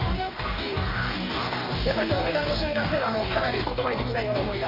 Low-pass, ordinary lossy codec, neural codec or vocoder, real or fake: 5.4 kHz; none; codec, 44.1 kHz, 2.6 kbps, DAC; fake